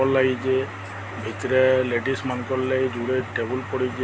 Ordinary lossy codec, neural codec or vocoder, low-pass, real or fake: none; none; none; real